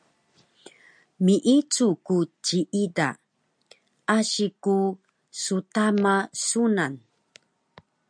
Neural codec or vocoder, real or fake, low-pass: none; real; 9.9 kHz